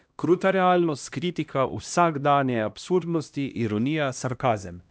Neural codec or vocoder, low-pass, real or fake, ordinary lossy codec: codec, 16 kHz, 1 kbps, X-Codec, HuBERT features, trained on LibriSpeech; none; fake; none